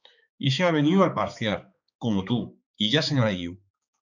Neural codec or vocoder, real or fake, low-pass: codec, 16 kHz, 4 kbps, X-Codec, HuBERT features, trained on balanced general audio; fake; 7.2 kHz